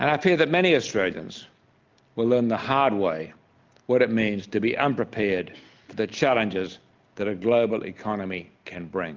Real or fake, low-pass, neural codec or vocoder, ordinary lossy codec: real; 7.2 kHz; none; Opus, 24 kbps